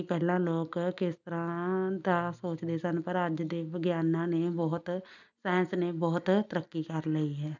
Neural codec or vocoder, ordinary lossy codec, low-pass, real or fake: none; none; 7.2 kHz; real